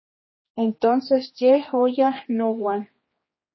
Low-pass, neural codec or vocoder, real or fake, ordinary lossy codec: 7.2 kHz; codec, 16 kHz, 2 kbps, X-Codec, HuBERT features, trained on general audio; fake; MP3, 24 kbps